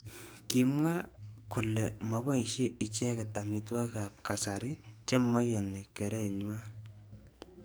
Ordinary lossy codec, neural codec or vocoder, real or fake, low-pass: none; codec, 44.1 kHz, 2.6 kbps, SNAC; fake; none